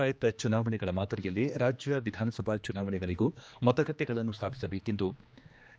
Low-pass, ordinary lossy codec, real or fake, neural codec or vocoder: none; none; fake; codec, 16 kHz, 2 kbps, X-Codec, HuBERT features, trained on general audio